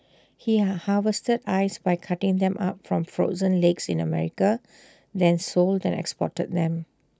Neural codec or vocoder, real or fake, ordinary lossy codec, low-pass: none; real; none; none